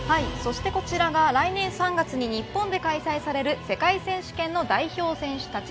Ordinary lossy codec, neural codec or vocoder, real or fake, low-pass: none; none; real; none